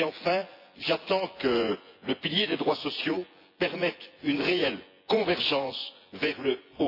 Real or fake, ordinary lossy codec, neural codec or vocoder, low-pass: fake; AAC, 32 kbps; vocoder, 24 kHz, 100 mel bands, Vocos; 5.4 kHz